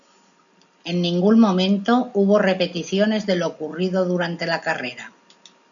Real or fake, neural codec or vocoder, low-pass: real; none; 7.2 kHz